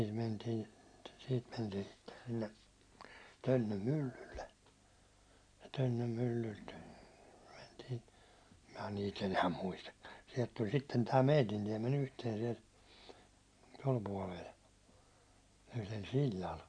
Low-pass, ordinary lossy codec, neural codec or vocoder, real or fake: 9.9 kHz; none; none; real